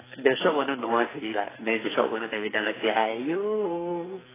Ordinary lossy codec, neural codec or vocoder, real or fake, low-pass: AAC, 16 kbps; codec, 44.1 kHz, 2.6 kbps, SNAC; fake; 3.6 kHz